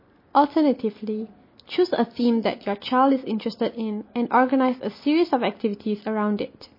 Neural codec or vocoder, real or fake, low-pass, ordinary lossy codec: none; real; 5.4 kHz; MP3, 24 kbps